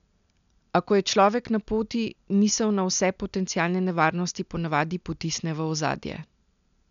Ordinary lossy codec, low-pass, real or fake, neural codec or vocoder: none; 7.2 kHz; real; none